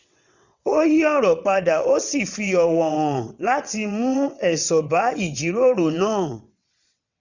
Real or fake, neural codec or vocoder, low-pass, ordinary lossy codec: fake; vocoder, 22.05 kHz, 80 mel bands, WaveNeXt; 7.2 kHz; none